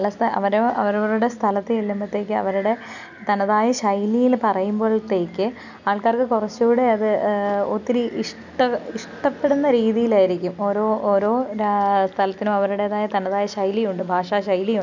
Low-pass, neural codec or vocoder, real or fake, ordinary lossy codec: 7.2 kHz; none; real; none